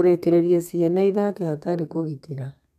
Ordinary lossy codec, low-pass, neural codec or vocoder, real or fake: none; 14.4 kHz; codec, 32 kHz, 1.9 kbps, SNAC; fake